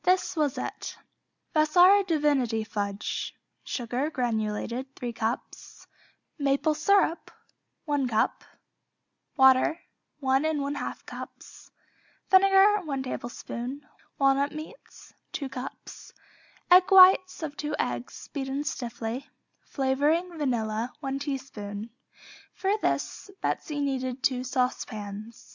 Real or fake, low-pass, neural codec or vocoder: real; 7.2 kHz; none